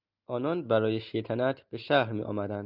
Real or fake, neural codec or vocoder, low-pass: real; none; 5.4 kHz